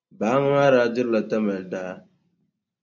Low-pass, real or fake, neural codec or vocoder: 7.2 kHz; real; none